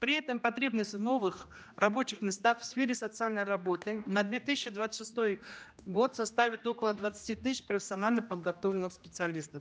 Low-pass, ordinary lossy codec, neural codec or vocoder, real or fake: none; none; codec, 16 kHz, 1 kbps, X-Codec, HuBERT features, trained on general audio; fake